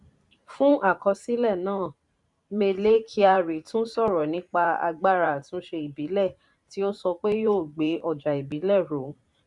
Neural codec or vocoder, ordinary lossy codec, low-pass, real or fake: vocoder, 44.1 kHz, 128 mel bands every 512 samples, BigVGAN v2; none; 10.8 kHz; fake